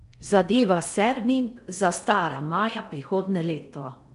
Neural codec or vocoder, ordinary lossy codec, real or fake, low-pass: codec, 16 kHz in and 24 kHz out, 0.6 kbps, FocalCodec, streaming, 4096 codes; none; fake; 10.8 kHz